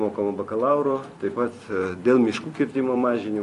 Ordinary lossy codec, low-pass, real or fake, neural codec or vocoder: MP3, 48 kbps; 14.4 kHz; fake; vocoder, 44.1 kHz, 128 mel bands every 256 samples, BigVGAN v2